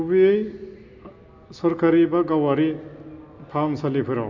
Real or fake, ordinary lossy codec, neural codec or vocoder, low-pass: real; MP3, 64 kbps; none; 7.2 kHz